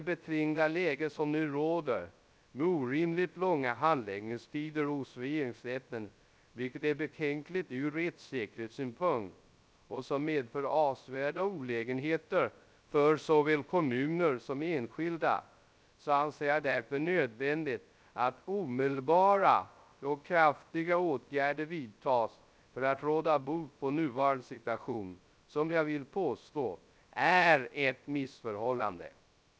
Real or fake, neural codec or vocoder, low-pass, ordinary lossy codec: fake; codec, 16 kHz, 0.3 kbps, FocalCodec; none; none